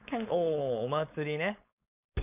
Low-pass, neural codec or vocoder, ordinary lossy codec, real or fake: 3.6 kHz; codec, 16 kHz, 4.8 kbps, FACodec; none; fake